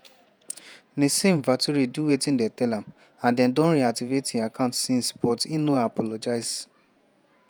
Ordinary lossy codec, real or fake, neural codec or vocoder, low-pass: none; fake; vocoder, 48 kHz, 128 mel bands, Vocos; none